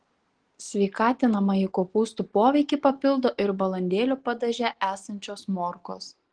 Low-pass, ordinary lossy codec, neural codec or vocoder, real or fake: 9.9 kHz; Opus, 16 kbps; none; real